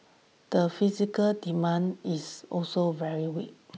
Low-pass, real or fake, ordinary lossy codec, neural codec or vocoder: none; real; none; none